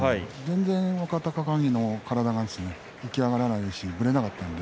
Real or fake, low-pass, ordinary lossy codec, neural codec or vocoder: real; none; none; none